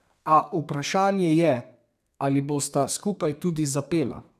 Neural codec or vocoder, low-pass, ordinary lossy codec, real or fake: codec, 32 kHz, 1.9 kbps, SNAC; 14.4 kHz; none; fake